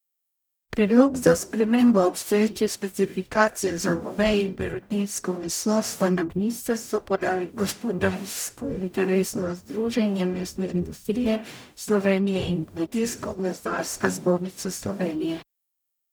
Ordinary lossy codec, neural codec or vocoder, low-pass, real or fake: none; codec, 44.1 kHz, 0.9 kbps, DAC; none; fake